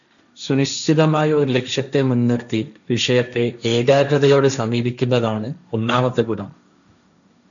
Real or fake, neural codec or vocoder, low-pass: fake; codec, 16 kHz, 1.1 kbps, Voila-Tokenizer; 7.2 kHz